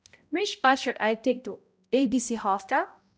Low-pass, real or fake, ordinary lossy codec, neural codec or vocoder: none; fake; none; codec, 16 kHz, 0.5 kbps, X-Codec, HuBERT features, trained on balanced general audio